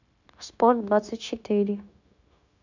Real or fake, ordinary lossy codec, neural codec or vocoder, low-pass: fake; none; codec, 16 kHz, 0.9 kbps, LongCat-Audio-Codec; 7.2 kHz